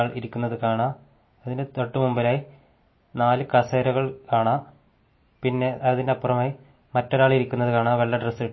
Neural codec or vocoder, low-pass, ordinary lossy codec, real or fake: none; 7.2 kHz; MP3, 24 kbps; real